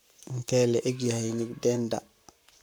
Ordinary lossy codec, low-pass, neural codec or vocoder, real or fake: none; none; codec, 44.1 kHz, 7.8 kbps, Pupu-Codec; fake